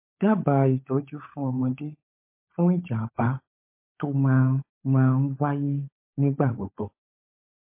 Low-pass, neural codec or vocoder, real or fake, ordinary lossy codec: 3.6 kHz; codec, 16 kHz, 16 kbps, FunCodec, trained on LibriTTS, 50 frames a second; fake; MP3, 24 kbps